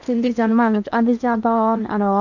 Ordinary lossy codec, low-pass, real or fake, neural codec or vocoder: none; 7.2 kHz; fake; codec, 16 kHz in and 24 kHz out, 0.8 kbps, FocalCodec, streaming, 65536 codes